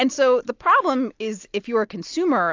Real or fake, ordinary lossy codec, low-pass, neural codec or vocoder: real; AAC, 48 kbps; 7.2 kHz; none